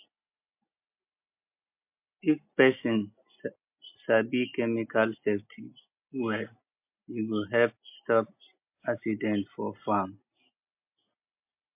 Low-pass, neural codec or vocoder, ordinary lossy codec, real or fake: 3.6 kHz; none; MP3, 32 kbps; real